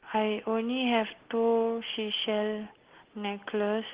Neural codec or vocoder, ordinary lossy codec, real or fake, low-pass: none; Opus, 16 kbps; real; 3.6 kHz